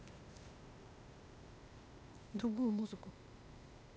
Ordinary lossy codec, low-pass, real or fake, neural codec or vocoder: none; none; fake; codec, 16 kHz, 0.8 kbps, ZipCodec